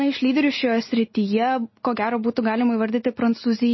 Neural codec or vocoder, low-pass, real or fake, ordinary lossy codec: none; 7.2 kHz; real; MP3, 24 kbps